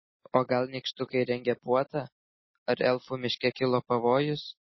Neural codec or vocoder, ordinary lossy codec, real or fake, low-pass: none; MP3, 24 kbps; real; 7.2 kHz